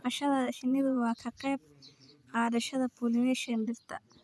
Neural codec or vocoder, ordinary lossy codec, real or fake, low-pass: vocoder, 24 kHz, 100 mel bands, Vocos; none; fake; none